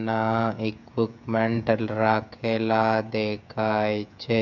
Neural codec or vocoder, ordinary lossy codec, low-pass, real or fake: codec, 16 kHz, 16 kbps, FreqCodec, smaller model; none; 7.2 kHz; fake